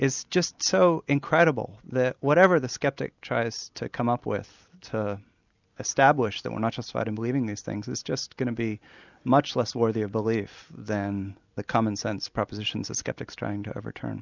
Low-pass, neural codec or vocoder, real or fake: 7.2 kHz; none; real